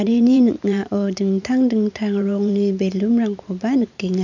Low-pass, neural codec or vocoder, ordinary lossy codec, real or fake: 7.2 kHz; vocoder, 44.1 kHz, 128 mel bands every 512 samples, BigVGAN v2; none; fake